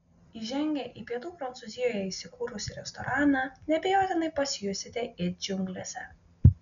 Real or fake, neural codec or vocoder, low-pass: real; none; 7.2 kHz